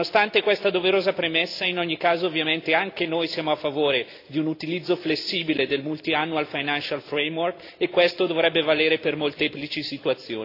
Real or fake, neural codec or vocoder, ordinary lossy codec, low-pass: real; none; AAC, 32 kbps; 5.4 kHz